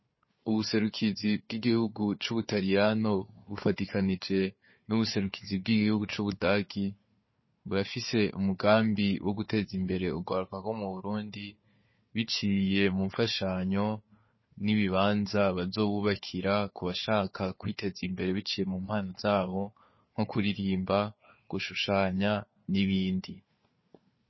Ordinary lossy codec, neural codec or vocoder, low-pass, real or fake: MP3, 24 kbps; codec, 16 kHz, 4 kbps, FunCodec, trained on Chinese and English, 50 frames a second; 7.2 kHz; fake